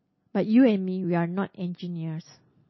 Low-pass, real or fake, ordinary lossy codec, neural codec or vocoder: 7.2 kHz; real; MP3, 24 kbps; none